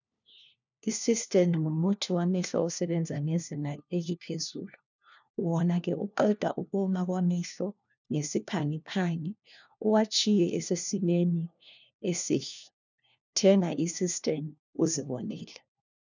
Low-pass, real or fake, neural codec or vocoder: 7.2 kHz; fake; codec, 16 kHz, 1 kbps, FunCodec, trained on LibriTTS, 50 frames a second